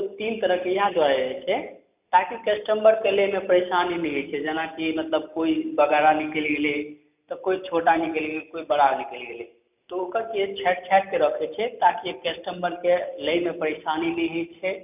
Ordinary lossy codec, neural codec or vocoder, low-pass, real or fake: none; none; 3.6 kHz; real